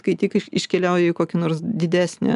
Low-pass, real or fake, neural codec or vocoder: 10.8 kHz; real; none